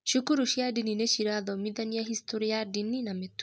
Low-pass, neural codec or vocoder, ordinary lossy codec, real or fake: none; none; none; real